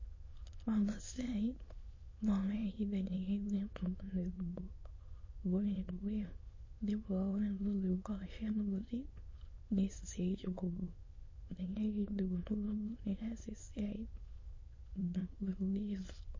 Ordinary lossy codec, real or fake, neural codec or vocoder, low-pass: MP3, 32 kbps; fake; autoencoder, 22.05 kHz, a latent of 192 numbers a frame, VITS, trained on many speakers; 7.2 kHz